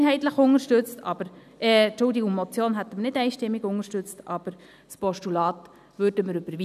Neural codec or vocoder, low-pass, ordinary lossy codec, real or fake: none; 14.4 kHz; none; real